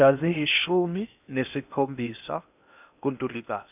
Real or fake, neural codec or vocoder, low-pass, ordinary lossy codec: fake; codec, 16 kHz in and 24 kHz out, 0.8 kbps, FocalCodec, streaming, 65536 codes; 3.6 kHz; none